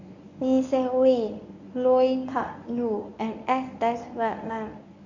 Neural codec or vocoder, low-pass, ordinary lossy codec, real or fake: codec, 24 kHz, 0.9 kbps, WavTokenizer, medium speech release version 1; 7.2 kHz; none; fake